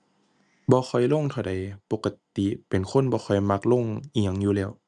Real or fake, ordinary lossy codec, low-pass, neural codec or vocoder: real; none; none; none